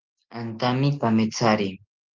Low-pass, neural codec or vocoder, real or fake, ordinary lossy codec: 7.2 kHz; none; real; Opus, 32 kbps